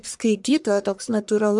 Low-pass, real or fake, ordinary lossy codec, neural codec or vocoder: 10.8 kHz; fake; MP3, 96 kbps; codec, 44.1 kHz, 1.7 kbps, Pupu-Codec